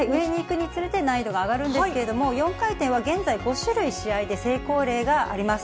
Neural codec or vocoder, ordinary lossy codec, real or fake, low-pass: none; none; real; none